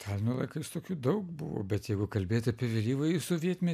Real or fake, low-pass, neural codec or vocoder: real; 14.4 kHz; none